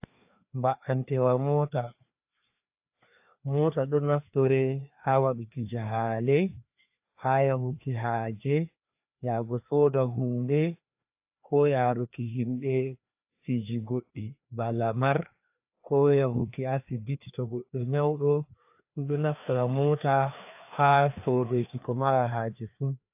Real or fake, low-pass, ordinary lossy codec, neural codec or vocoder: fake; 3.6 kHz; AAC, 32 kbps; codec, 16 kHz, 2 kbps, FreqCodec, larger model